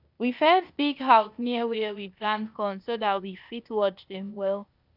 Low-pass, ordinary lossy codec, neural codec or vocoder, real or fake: 5.4 kHz; none; codec, 16 kHz, 0.8 kbps, ZipCodec; fake